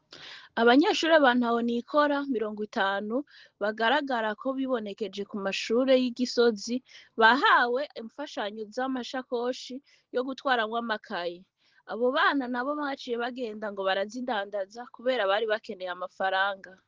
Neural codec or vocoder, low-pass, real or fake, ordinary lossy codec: none; 7.2 kHz; real; Opus, 16 kbps